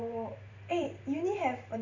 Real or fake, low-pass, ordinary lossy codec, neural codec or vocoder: fake; 7.2 kHz; none; vocoder, 44.1 kHz, 128 mel bands every 512 samples, BigVGAN v2